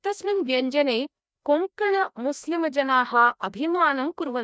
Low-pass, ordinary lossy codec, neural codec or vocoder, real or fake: none; none; codec, 16 kHz, 1 kbps, FreqCodec, larger model; fake